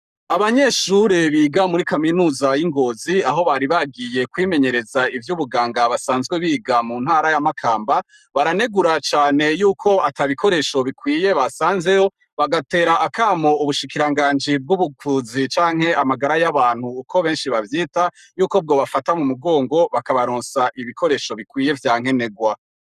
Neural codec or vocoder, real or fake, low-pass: codec, 44.1 kHz, 7.8 kbps, Pupu-Codec; fake; 14.4 kHz